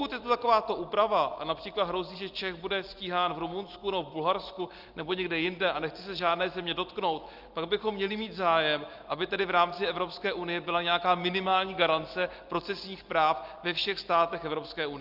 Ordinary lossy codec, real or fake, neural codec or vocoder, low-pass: Opus, 32 kbps; real; none; 5.4 kHz